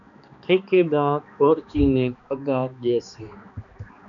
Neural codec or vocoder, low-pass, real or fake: codec, 16 kHz, 2 kbps, X-Codec, HuBERT features, trained on balanced general audio; 7.2 kHz; fake